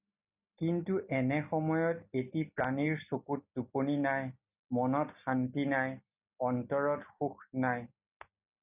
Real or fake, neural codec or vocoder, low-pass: real; none; 3.6 kHz